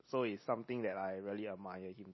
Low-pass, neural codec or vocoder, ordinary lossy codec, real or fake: 7.2 kHz; none; MP3, 24 kbps; real